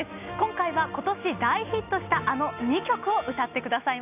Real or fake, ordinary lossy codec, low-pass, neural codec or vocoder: real; none; 3.6 kHz; none